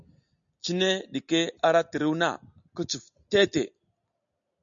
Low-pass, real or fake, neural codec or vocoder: 7.2 kHz; real; none